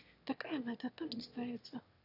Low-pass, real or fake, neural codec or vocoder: 5.4 kHz; fake; autoencoder, 22.05 kHz, a latent of 192 numbers a frame, VITS, trained on one speaker